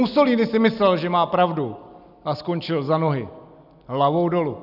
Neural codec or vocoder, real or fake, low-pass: none; real; 5.4 kHz